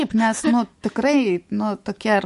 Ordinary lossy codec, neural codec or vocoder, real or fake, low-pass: MP3, 48 kbps; autoencoder, 48 kHz, 128 numbers a frame, DAC-VAE, trained on Japanese speech; fake; 14.4 kHz